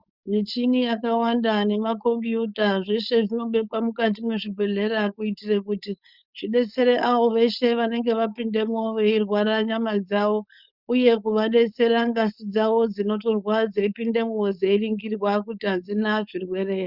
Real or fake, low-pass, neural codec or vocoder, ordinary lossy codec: fake; 5.4 kHz; codec, 16 kHz, 4.8 kbps, FACodec; Opus, 64 kbps